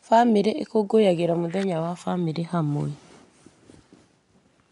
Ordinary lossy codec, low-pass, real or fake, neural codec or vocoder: MP3, 96 kbps; 10.8 kHz; real; none